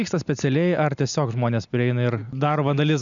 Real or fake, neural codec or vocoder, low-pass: real; none; 7.2 kHz